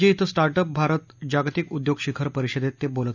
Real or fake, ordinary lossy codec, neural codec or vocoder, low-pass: real; none; none; 7.2 kHz